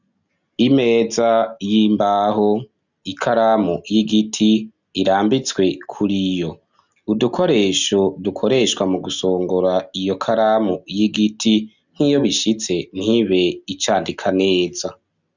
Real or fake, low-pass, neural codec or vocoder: real; 7.2 kHz; none